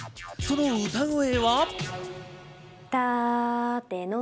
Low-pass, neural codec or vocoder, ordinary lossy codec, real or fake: none; none; none; real